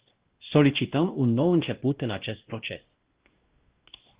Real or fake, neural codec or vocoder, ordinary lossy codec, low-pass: fake; codec, 16 kHz, 1 kbps, X-Codec, WavLM features, trained on Multilingual LibriSpeech; Opus, 16 kbps; 3.6 kHz